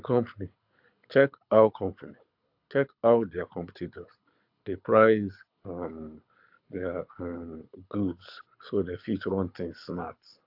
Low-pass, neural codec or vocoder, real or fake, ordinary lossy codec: 5.4 kHz; codec, 24 kHz, 6 kbps, HILCodec; fake; AAC, 48 kbps